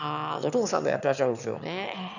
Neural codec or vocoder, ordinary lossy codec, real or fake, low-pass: autoencoder, 22.05 kHz, a latent of 192 numbers a frame, VITS, trained on one speaker; none; fake; 7.2 kHz